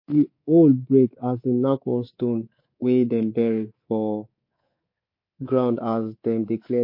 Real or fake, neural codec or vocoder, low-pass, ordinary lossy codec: fake; codec, 24 kHz, 3.1 kbps, DualCodec; 5.4 kHz; MP3, 32 kbps